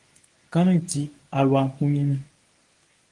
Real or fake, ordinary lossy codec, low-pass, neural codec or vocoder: fake; Opus, 24 kbps; 10.8 kHz; codec, 24 kHz, 0.9 kbps, WavTokenizer, medium speech release version 1